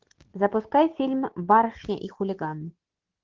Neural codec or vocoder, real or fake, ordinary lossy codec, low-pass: none; real; Opus, 16 kbps; 7.2 kHz